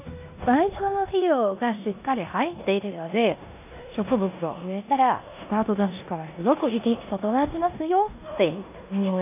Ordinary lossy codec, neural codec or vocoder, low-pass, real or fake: none; codec, 16 kHz in and 24 kHz out, 0.9 kbps, LongCat-Audio-Codec, four codebook decoder; 3.6 kHz; fake